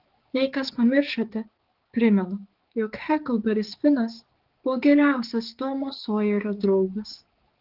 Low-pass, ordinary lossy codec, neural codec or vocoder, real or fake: 5.4 kHz; Opus, 16 kbps; codec, 16 kHz, 4 kbps, X-Codec, HuBERT features, trained on balanced general audio; fake